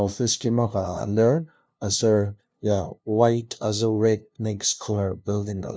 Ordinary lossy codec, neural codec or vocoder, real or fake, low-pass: none; codec, 16 kHz, 0.5 kbps, FunCodec, trained on LibriTTS, 25 frames a second; fake; none